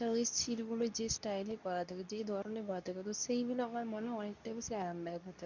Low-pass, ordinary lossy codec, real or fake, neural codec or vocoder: 7.2 kHz; none; fake; codec, 24 kHz, 0.9 kbps, WavTokenizer, medium speech release version 2